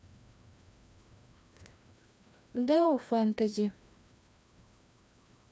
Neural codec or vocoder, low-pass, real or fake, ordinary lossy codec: codec, 16 kHz, 1 kbps, FreqCodec, larger model; none; fake; none